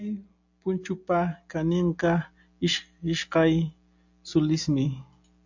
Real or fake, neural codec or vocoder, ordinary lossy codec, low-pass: real; none; AAC, 48 kbps; 7.2 kHz